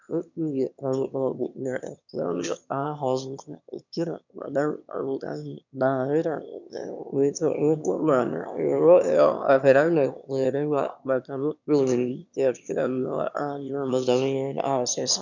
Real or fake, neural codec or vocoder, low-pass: fake; autoencoder, 22.05 kHz, a latent of 192 numbers a frame, VITS, trained on one speaker; 7.2 kHz